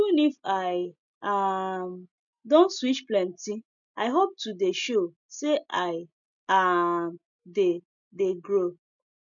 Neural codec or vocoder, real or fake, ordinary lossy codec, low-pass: none; real; none; 7.2 kHz